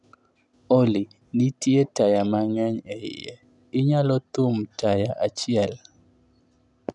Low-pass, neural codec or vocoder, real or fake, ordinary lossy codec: 10.8 kHz; none; real; none